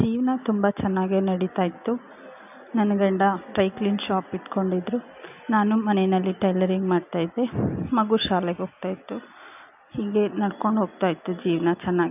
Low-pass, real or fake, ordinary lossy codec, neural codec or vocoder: 3.6 kHz; real; none; none